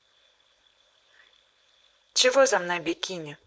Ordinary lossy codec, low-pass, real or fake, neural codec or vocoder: none; none; fake; codec, 16 kHz, 4.8 kbps, FACodec